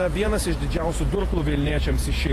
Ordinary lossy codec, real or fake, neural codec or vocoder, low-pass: AAC, 48 kbps; fake; vocoder, 48 kHz, 128 mel bands, Vocos; 14.4 kHz